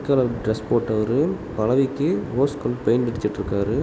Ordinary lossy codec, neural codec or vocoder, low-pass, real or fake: none; none; none; real